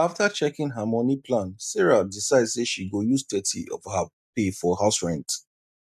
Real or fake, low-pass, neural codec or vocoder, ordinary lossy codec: real; 14.4 kHz; none; none